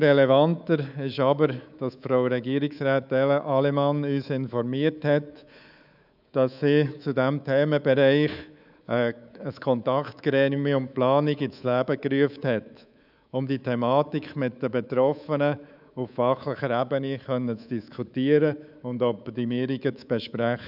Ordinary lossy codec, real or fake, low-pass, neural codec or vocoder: none; fake; 5.4 kHz; codec, 24 kHz, 3.1 kbps, DualCodec